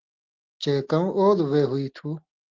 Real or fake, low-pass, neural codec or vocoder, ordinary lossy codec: real; 7.2 kHz; none; Opus, 16 kbps